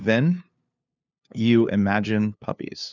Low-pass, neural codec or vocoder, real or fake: 7.2 kHz; codec, 16 kHz, 2 kbps, FunCodec, trained on LibriTTS, 25 frames a second; fake